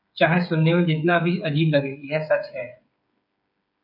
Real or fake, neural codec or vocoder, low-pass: fake; codec, 16 kHz, 8 kbps, FreqCodec, smaller model; 5.4 kHz